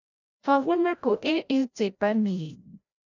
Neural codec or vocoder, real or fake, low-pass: codec, 16 kHz, 0.5 kbps, FreqCodec, larger model; fake; 7.2 kHz